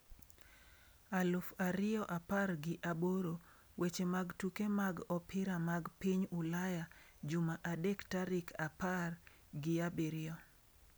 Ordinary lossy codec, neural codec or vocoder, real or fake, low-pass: none; none; real; none